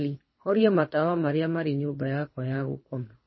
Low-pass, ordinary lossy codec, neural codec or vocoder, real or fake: 7.2 kHz; MP3, 24 kbps; codec, 24 kHz, 3 kbps, HILCodec; fake